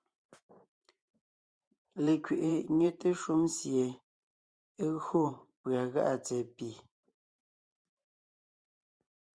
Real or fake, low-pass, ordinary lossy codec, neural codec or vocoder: fake; 9.9 kHz; Opus, 64 kbps; vocoder, 44.1 kHz, 128 mel bands every 512 samples, BigVGAN v2